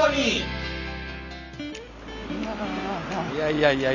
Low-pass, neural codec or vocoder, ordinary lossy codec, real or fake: 7.2 kHz; none; none; real